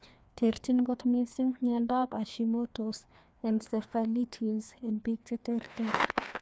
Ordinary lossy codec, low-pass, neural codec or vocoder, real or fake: none; none; codec, 16 kHz, 2 kbps, FreqCodec, larger model; fake